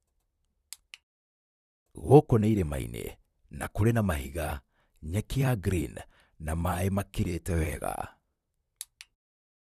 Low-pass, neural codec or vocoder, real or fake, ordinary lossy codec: 14.4 kHz; vocoder, 44.1 kHz, 128 mel bands, Pupu-Vocoder; fake; none